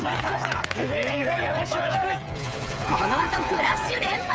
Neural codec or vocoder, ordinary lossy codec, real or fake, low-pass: codec, 16 kHz, 8 kbps, FreqCodec, smaller model; none; fake; none